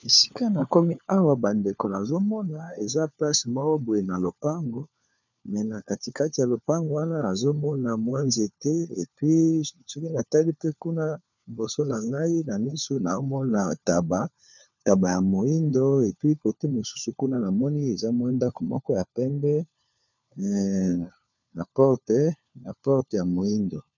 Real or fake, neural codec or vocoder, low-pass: fake; codec, 16 kHz, 4 kbps, FunCodec, trained on LibriTTS, 50 frames a second; 7.2 kHz